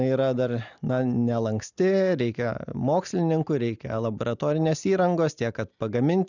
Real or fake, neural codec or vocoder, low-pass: real; none; 7.2 kHz